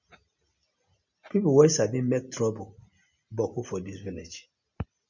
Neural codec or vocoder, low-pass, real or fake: none; 7.2 kHz; real